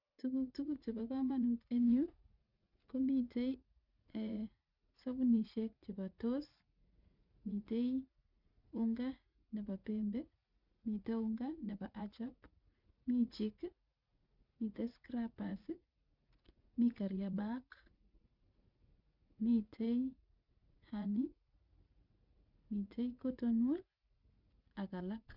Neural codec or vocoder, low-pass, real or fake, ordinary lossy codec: vocoder, 44.1 kHz, 80 mel bands, Vocos; 5.4 kHz; fake; none